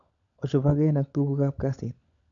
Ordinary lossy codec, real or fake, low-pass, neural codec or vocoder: AAC, 64 kbps; fake; 7.2 kHz; codec, 16 kHz, 16 kbps, FunCodec, trained on LibriTTS, 50 frames a second